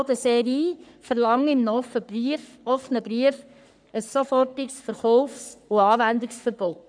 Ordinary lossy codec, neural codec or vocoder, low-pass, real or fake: none; codec, 44.1 kHz, 3.4 kbps, Pupu-Codec; 9.9 kHz; fake